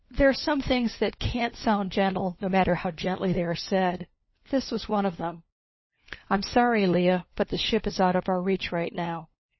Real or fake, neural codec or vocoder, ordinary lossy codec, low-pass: fake; codec, 16 kHz, 4 kbps, FunCodec, trained on LibriTTS, 50 frames a second; MP3, 24 kbps; 7.2 kHz